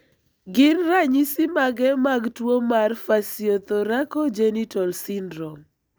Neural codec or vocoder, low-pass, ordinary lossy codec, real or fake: none; none; none; real